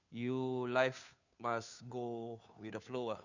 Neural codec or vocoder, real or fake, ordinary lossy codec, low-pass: codec, 16 kHz, 2 kbps, FunCodec, trained on Chinese and English, 25 frames a second; fake; none; 7.2 kHz